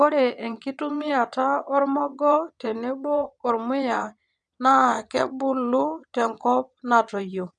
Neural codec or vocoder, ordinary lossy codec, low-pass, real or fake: vocoder, 22.05 kHz, 80 mel bands, WaveNeXt; none; 9.9 kHz; fake